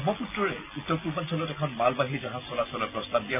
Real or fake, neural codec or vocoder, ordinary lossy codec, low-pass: real; none; none; 3.6 kHz